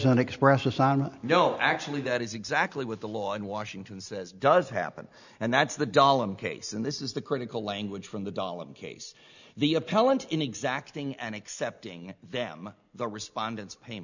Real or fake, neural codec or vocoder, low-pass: real; none; 7.2 kHz